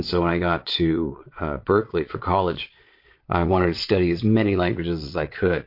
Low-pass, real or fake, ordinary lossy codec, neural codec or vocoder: 5.4 kHz; fake; MP3, 32 kbps; vocoder, 22.05 kHz, 80 mel bands, Vocos